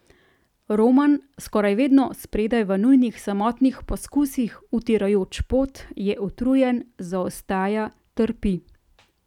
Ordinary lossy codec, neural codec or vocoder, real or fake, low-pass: none; none; real; 19.8 kHz